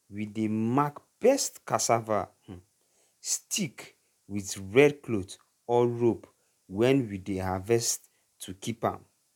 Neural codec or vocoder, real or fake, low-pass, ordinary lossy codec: none; real; 19.8 kHz; none